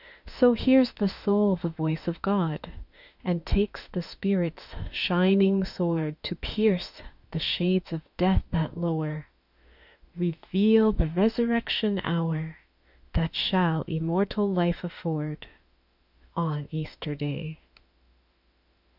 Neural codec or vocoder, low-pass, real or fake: autoencoder, 48 kHz, 32 numbers a frame, DAC-VAE, trained on Japanese speech; 5.4 kHz; fake